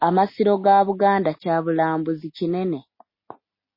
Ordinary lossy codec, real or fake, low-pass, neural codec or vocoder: MP3, 24 kbps; real; 5.4 kHz; none